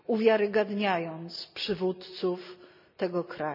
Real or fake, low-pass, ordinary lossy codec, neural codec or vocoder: real; 5.4 kHz; none; none